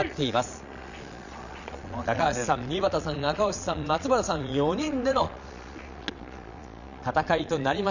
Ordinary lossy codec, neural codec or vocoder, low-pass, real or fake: none; vocoder, 22.05 kHz, 80 mel bands, Vocos; 7.2 kHz; fake